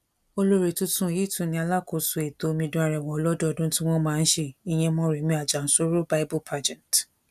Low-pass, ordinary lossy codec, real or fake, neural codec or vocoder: 14.4 kHz; none; real; none